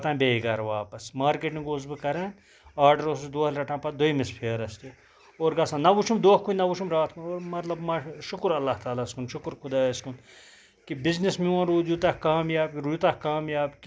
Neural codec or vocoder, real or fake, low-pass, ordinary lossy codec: none; real; none; none